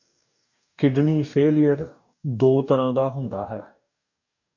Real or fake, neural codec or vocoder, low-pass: fake; codec, 44.1 kHz, 2.6 kbps, DAC; 7.2 kHz